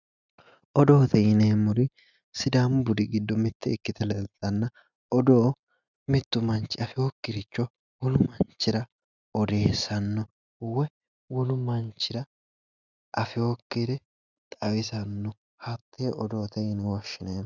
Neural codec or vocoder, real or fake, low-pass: none; real; 7.2 kHz